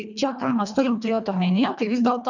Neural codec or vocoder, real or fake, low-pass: codec, 24 kHz, 1.5 kbps, HILCodec; fake; 7.2 kHz